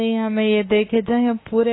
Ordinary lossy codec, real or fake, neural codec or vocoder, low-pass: AAC, 16 kbps; fake; autoencoder, 48 kHz, 32 numbers a frame, DAC-VAE, trained on Japanese speech; 7.2 kHz